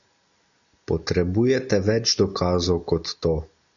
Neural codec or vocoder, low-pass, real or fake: none; 7.2 kHz; real